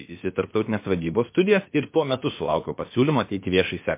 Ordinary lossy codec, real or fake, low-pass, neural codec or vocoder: MP3, 24 kbps; fake; 3.6 kHz; codec, 16 kHz, about 1 kbps, DyCAST, with the encoder's durations